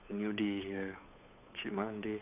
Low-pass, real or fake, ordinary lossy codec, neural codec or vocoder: 3.6 kHz; fake; none; codec, 16 kHz, 8 kbps, FunCodec, trained on Chinese and English, 25 frames a second